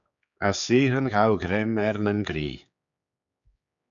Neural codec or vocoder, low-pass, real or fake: codec, 16 kHz, 4 kbps, X-Codec, HuBERT features, trained on general audio; 7.2 kHz; fake